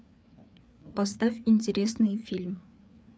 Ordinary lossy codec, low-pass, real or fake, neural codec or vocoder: none; none; fake; codec, 16 kHz, 4 kbps, FreqCodec, larger model